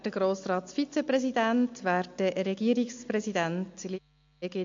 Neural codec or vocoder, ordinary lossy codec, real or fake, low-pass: none; MP3, 48 kbps; real; 7.2 kHz